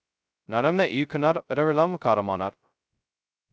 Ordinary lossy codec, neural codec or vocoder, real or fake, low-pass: none; codec, 16 kHz, 0.2 kbps, FocalCodec; fake; none